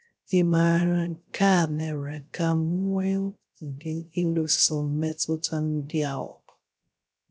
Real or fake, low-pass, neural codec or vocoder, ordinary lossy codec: fake; none; codec, 16 kHz, 0.3 kbps, FocalCodec; none